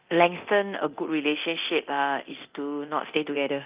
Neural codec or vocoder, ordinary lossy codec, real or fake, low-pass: codec, 24 kHz, 0.9 kbps, DualCodec; Opus, 24 kbps; fake; 3.6 kHz